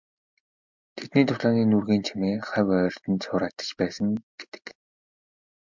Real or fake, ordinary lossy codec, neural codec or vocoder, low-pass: real; MP3, 64 kbps; none; 7.2 kHz